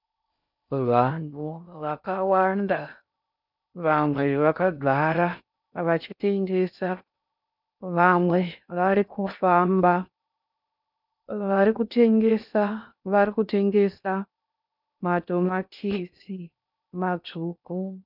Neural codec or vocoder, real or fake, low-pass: codec, 16 kHz in and 24 kHz out, 0.6 kbps, FocalCodec, streaming, 4096 codes; fake; 5.4 kHz